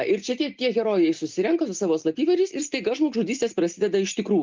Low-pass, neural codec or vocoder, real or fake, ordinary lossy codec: 7.2 kHz; none; real; Opus, 32 kbps